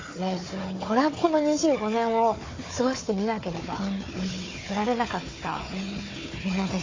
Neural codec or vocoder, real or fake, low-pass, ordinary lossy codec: codec, 16 kHz, 4 kbps, FunCodec, trained on Chinese and English, 50 frames a second; fake; 7.2 kHz; AAC, 32 kbps